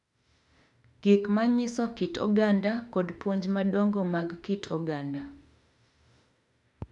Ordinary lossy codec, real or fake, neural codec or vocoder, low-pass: none; fake; autoencoder, 48 kHz, 32 numbers a frame, DAC-VAE, trained on Japanese speech; 10.8 kHz